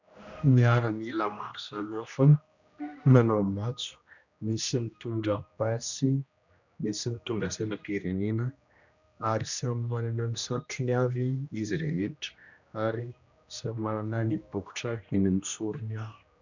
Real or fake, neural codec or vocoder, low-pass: fake; codec, 16 kHz, 1 kbps, X-Codec, HuBERT features, trained on general audio; 7.2 kHz